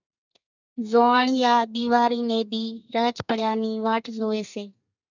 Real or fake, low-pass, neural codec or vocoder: fake; 7.2 kHz; codec, 32 kHz, 1.9 kbps, SNAC